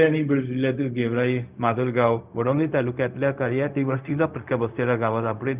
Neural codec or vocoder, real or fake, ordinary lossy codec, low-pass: codec, 16 kHz, 0.4 kbps, LongCat-Audio-Codec; fake; Opus, 24 kbps; 3.6 kHz